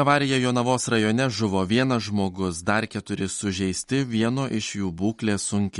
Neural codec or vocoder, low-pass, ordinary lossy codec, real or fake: none; 19.8 kHz; MP3, 48 kbps; real